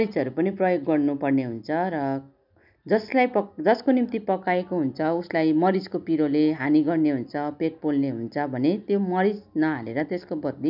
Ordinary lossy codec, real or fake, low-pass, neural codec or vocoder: none; real; 5.4 kHz; none